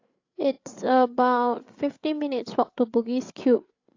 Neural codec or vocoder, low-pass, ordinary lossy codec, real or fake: codec, 16 kHz, 8 kbps, FreqCodec, larger model; 7.2 kHz; AAC, 48 kbps; fake